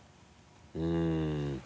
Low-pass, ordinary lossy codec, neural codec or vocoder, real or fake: none; none; none; real